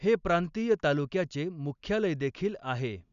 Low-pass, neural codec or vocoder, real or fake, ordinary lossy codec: 7.2 kHz; none; real; none